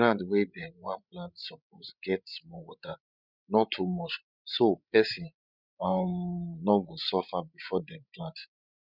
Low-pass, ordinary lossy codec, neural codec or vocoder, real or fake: 5.4 kHz; none; codec, 16 kHz, 16 kbps, FreqCodec, larger model; fake